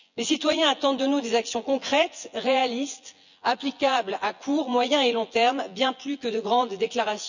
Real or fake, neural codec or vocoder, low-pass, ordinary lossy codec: fake; vocoder, 24 kHz, 100 mel bands, Vocos; 7.2 kHz; none